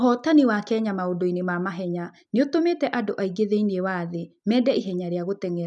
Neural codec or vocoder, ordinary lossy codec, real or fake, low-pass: none; none; real; 9.9 kHz